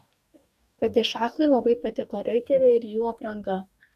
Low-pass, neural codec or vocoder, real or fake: 14.4 kHz; codec, 44.1 kHz, 2.6 kbps, DAC; fake